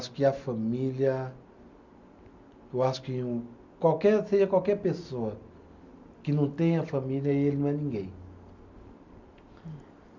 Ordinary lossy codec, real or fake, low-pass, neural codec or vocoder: none; real; 7.2 kHz; none